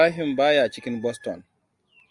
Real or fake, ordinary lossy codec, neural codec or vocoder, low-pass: real; Opus, 64 kbps; none; 10.8 kHz